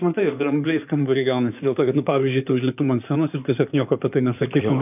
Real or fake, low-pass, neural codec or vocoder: fake; 3.6 kHz; codec, 16 kHz in and 24 kHz out, 2.2 kbps, FireRedTTS-2 codec